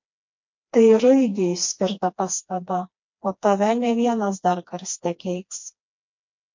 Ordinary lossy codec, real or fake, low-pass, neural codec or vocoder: MP3, 48 kbps; fake; 7.2 kHz; codec, 16 kHz, 2 kbps, FreqCodec, smaller model